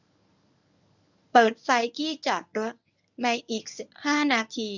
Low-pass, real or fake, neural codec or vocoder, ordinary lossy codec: 7.2 kHz; fake; codec, 24 kHz, 0.9 kbps, WavTokenizer, medium speech release version 1; none